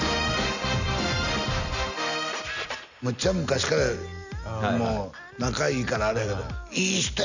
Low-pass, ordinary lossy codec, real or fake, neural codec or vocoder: 7.2 kHz; none; real; none